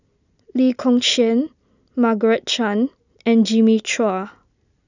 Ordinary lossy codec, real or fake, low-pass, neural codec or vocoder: none; real; 7.2 kHz; none